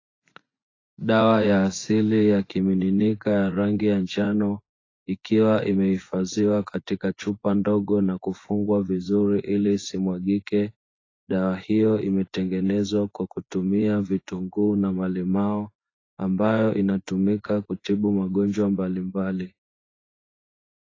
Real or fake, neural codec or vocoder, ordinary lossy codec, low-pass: fake; vocoder, 24 kHz, 100 mel bands, Vocos; AAC, 32 kbps; 7.2 kHz